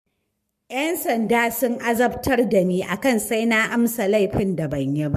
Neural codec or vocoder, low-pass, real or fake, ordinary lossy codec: codec, 44.1 kHz, 7.8 kbps, Pupu-Codec; 14.4 kHz; fake; MP3, 64 kbps